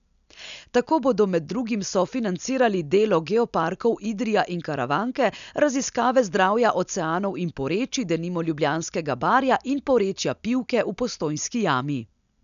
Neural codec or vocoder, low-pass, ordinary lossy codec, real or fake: none; 7.2 kHz; none; real